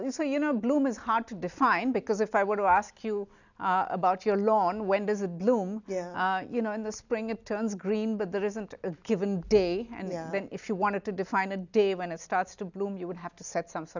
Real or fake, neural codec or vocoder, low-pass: real; none; 7.2 kHz